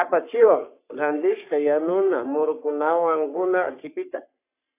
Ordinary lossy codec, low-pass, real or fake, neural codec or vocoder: AAC, 24 kbps; 3.6 kHz; fake; codec, 44.1 kHz, 3.4 kbps, Pupu-Codec